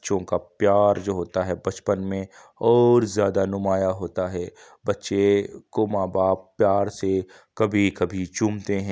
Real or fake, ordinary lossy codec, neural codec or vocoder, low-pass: real; none; none; none